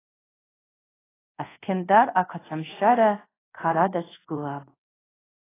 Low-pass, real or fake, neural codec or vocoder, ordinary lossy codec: 3.6 kHz; fake; codec, 24 kHz, 0.5 kbps, DualCodec; AAC, 16 kbps